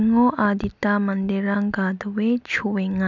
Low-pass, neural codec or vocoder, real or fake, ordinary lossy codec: 7.2 kHz; none; real; none